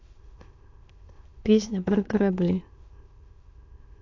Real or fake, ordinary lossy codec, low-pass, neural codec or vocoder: fake; AAC, 48 kbps; 7.2 kHz; autoencoder, 22.05 kHz, a latent of 192 numbers a frame, VITS, trained on many speakers